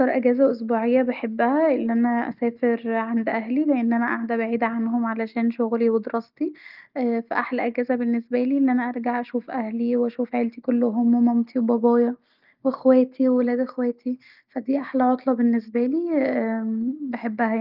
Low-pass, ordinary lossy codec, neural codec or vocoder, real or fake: 5.4 kHz; Opus, 32 kbps; none; real